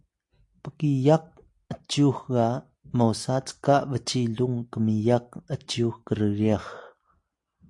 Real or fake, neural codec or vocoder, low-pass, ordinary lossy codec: real; none; 10.8 kHz; AAC, 64 kbps